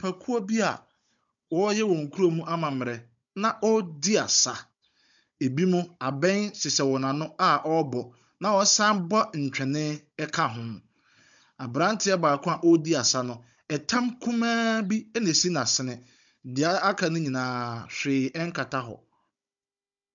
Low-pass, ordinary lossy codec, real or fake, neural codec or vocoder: 7.2 kHz; MP3, 64 kbps; fake; codec, 16 kHz, 16 kbps, FunCodec, trained on Chinese and English, 50 frames a second